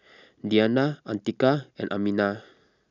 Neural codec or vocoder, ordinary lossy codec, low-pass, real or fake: none; none; 7.2 kHz; real